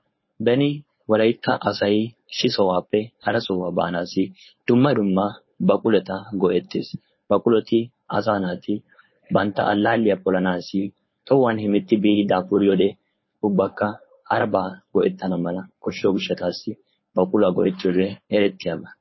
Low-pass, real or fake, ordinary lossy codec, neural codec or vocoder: 7.2 kHz; fake; MP3, 24 kbps; codec, 16 kHz, 4.8 kbps, FACodec